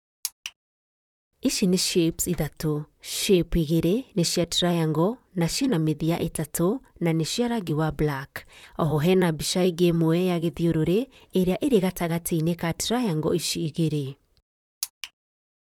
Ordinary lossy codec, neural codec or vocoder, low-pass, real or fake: none; vocoder, 44.1 kHz, 128 mel bands, Pupu-Vocoder; 19.8 kHz; fake